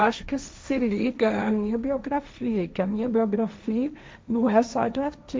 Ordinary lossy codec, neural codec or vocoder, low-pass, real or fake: none; codec, 16 kHz, 1.1 kbps, Voila-Tokenizer; none; fake